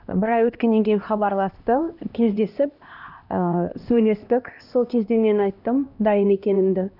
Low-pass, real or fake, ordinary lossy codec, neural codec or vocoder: 5.4 kHz; fake; none; codec, 16 kHz, 1 kbps, X-Codec, HuBERT features, trained on LibriSpeech